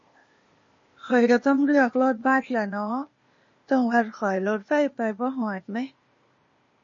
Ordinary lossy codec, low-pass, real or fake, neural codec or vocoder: MP3, 32 kbps; 7.2 kHz; fake; codec, 16 kHz, 0.8 kbps, ZipCodec